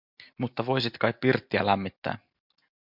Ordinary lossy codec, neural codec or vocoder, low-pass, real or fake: MP3, 48 kbps; none; 5.4 kHz; real